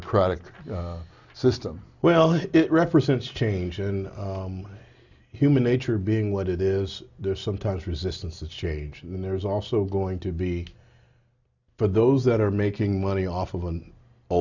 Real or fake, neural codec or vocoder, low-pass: real; none; 7.2 kHz